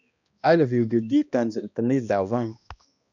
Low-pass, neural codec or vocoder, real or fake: 7.2 kHz; codec, 16 kHz, 1 kbps, X-Codec, HuBERT features, trained on balanced general audio; fake